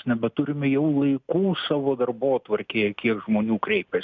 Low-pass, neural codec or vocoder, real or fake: 7.2 kHz; none; real